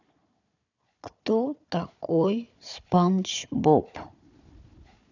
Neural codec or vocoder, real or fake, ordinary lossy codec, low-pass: codec, 16 kHz, 4 kbps, FunCodec, trained on Chinese and English, 50 frames a second; fake; none; 7.2 kHz